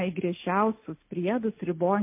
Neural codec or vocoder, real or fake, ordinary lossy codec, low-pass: none; real; MP3, 24 kbps; 3.6 kHz